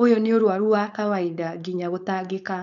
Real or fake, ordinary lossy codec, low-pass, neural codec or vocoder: fake; none; 7.2 kHz; codec, 16 kHz, 4.8 kbps, FACodec